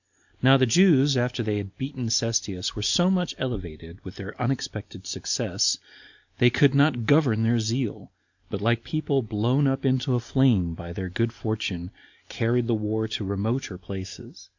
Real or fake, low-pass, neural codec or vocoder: real; 7.2 kHz; none